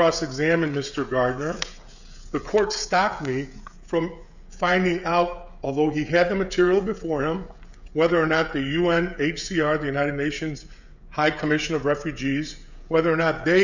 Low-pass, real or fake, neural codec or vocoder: 7.2 kHz; fake; codec, 16 kHz, 16 kbps, FreqCodec, smaller model